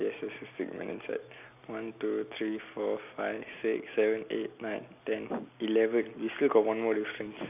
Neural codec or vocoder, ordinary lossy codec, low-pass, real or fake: none; none; 3.6 kHz; real